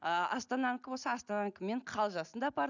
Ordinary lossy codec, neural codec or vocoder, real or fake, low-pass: none; none; real; 7.2 kHz